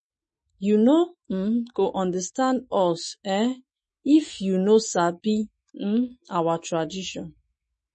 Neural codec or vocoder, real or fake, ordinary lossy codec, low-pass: autoencoder, 48 kHz, 128 numbers a frame, DAC-VAE, trained on Japanese speech; fake; MP3, 32 kbps; 10.8 kHz